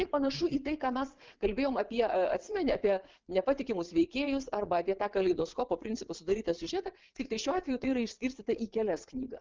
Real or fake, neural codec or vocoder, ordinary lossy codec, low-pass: fake; vocoder, 22.05 kHz, 80 mel bands, WaveNeXt; Opus, 32 kbps; 7.2 kHz